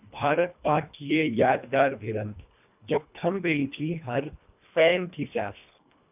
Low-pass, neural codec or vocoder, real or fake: 3.6 kHz; codec, 24 kHz, 1.5 kbps, HILCodec; fake